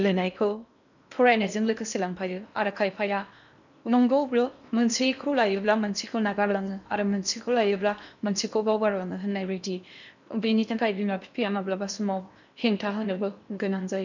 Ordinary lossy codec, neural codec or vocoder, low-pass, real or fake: none; codec, 16 kHz in and 24 kHz out, 0.8 kbps, FocalCodec, streaming, 65536 codes; 7.2 kHz; fake